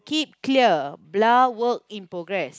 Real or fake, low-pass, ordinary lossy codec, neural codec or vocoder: real; none; none; none